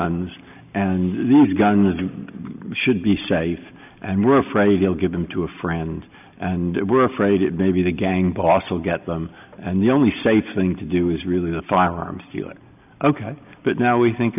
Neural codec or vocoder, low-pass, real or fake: none; 3.6 kHz; real